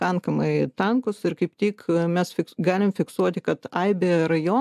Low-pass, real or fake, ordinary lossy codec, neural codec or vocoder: 14.4 kHz; real; MP3, 96 kbps; none